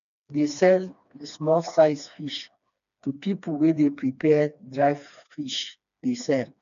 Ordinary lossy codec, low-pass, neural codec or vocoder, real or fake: none; 7.2 kHz; codec, 16 kHz, 2 kbps, FreqCodec, smaller model; fake